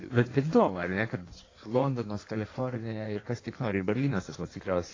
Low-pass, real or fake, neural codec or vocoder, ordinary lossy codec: 7.2 kHz; fake; codec, 16 kHz in and 24 kHz out, 1.1 kbps, FireRedTTS-2 codec; AAC, 32 kbps